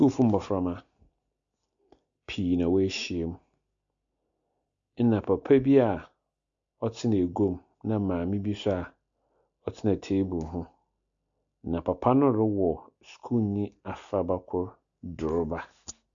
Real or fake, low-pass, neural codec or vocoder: real; 7.2 kHz; none